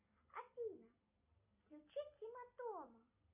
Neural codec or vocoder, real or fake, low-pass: none; real; 3.6 kHz